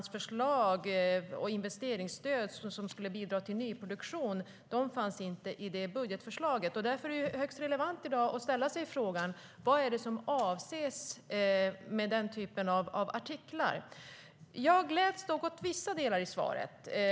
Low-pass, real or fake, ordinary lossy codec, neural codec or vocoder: none; real; none; none